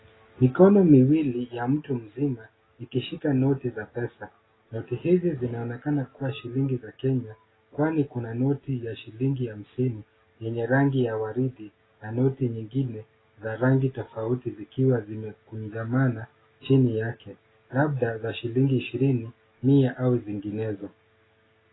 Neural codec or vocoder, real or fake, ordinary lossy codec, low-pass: none; real; AAC, 16 kbps; 7.2 kHz